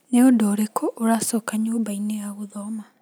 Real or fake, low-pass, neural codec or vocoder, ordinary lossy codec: real; none; none; none